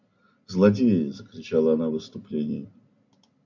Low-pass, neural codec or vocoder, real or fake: 7.2 kHz; none; real